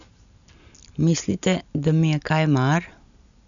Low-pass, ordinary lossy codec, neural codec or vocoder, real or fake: 7.2 kHz; none; none; real